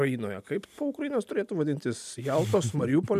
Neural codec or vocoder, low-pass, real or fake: none; 14.4 kHz; real